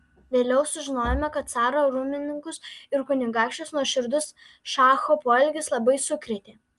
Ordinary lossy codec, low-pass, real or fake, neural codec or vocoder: Opus, 32 kbps; 10.8 kHz; real; none